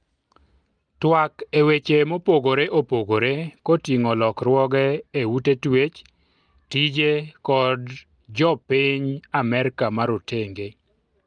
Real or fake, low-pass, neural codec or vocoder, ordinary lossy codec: real; 9.9 kHz; none; Opus, 32 kbps